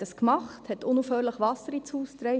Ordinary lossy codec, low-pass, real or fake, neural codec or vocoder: none; none; real; none